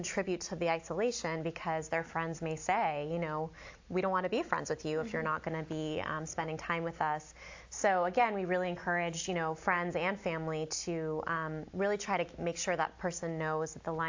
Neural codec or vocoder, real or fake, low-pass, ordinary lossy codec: none; real; 7.2 kHz; AAC, 48 kbps